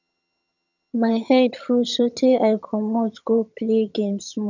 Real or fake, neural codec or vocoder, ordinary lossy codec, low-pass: fake; vocoder, 22.05 kHz, 80 mel bands, HiFi-GAN; none; 7.2 kHz